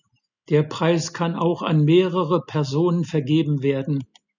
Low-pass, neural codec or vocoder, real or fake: 7.2 kHz; none; real